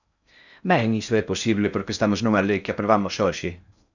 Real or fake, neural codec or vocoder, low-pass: fake; codec, 16 kHz in and 24 kHz out, 0.6 kbps, FocalCodec, streaming, 4096 codes; 7.2 kHz